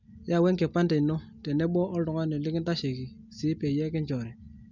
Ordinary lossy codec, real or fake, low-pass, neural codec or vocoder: none; real; 7.2 kHz; none